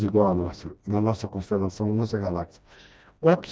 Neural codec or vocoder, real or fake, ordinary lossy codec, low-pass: codec, 16 kHz, 1 kbps, FreqCodec, smaller model; fake; none; none